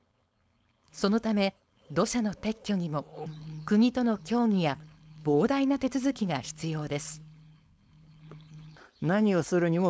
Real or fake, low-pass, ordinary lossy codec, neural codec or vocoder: fake; none; none; codec, 16 kHz, 4.8 kbps, FACodec